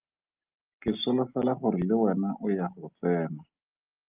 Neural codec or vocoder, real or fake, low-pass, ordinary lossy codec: none; real; 3.6 kHz; Opus, 24 kbps